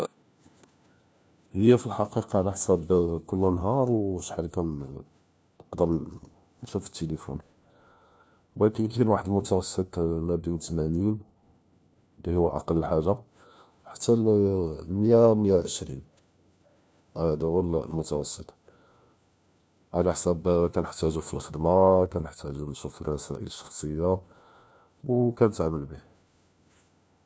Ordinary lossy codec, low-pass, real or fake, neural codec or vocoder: none; none; fake; codec, 16 kHz, 1 kbps, FunCodec, trained on LibriTTS, 50 frames a second